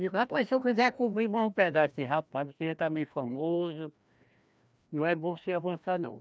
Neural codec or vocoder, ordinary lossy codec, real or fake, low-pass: codec, 16 kHz, 1 kbps, FreqCodec, larger model; none; fake; none